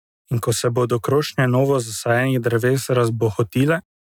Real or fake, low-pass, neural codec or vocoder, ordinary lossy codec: real; 19.8 kHz; none; none